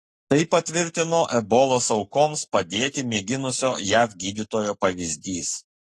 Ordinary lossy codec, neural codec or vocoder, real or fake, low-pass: AAC, 48 kbps; codec, 44.1 kHz, 7.8 kbps, Pupu-Codec; fake; 14.4 kHz